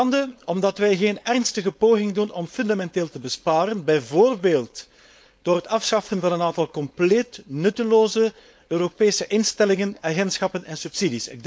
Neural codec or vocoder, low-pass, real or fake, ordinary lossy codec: codec, 16 kHz, 4.8 kbps, FACodec; none; fake; none